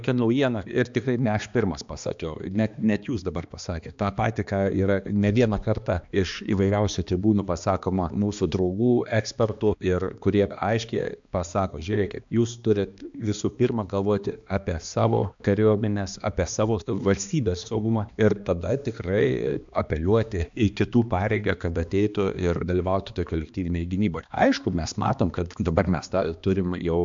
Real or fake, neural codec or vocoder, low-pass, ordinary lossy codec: fake; codec, 16 kHz, 2 kbps, X-Codec, HuBERT features, trained on balanced general audio; 7.2 kHz; MP3, 64 kbps